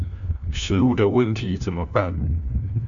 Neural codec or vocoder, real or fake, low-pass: codec, 16 kHz, 1 kbps, FunCodec, trained on LibriTTS, 50 frames a second; fake; 7.2 kHz